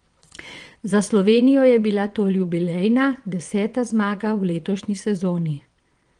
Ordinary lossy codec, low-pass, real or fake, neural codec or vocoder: Opus, 24 kbps; 9.9 kHz; fake; vocoder, 22.05 kHz, 80 mel bands, WaveNeXt